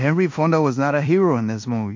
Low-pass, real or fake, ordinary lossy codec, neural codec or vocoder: 7.2 kHz; fake; MP3, 48 kbps; codec, 16 kHz in and 24 kHz out, 0.9 kbps, LongCat-Audio-Codec, fine tuned four codebook decoder